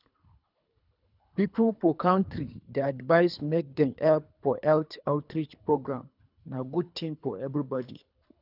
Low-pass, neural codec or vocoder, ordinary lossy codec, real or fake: 5.4 kHz; codec, 24 kHz, 3 kbps, HILCodec; none; fake